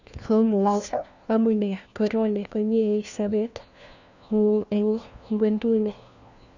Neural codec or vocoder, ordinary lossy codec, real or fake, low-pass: codec, 16 kHz, 1 kbps, FunCodec, trained on LibriTTS, 50 frames a second; none; fake; 7.2 kHz